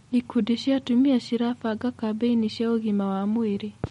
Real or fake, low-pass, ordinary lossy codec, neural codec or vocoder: real; 19.8 kHz; MP3, 48 kbps; none